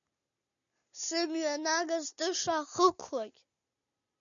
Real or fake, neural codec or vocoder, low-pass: real; none; 7.2 kHz